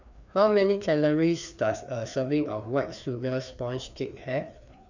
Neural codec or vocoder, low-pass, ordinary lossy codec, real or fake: codec, 16 kHz, 2 kbps, FreqCodec, larger model; 7.2 kHz; none; fake